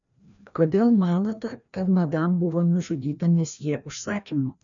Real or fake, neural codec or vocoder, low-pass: fake; codec, 16 kHz, 1 kbps, FreqCodec, larger model; 7.2 kHz